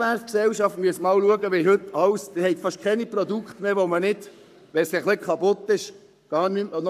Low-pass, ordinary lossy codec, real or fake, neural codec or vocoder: 14.4 kHz; none; fake; codec, 44.1 kHz, 7.8 kbps, Pupu-Codec